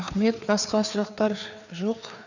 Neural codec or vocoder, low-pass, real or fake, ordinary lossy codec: codec, 16 kHz, 4 kbps, FreqCodec, larger model; 7.2 kHz; fake; none